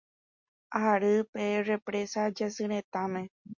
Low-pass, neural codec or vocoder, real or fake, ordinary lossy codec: 7.2 kHz; none; real; MP3, 48 kbps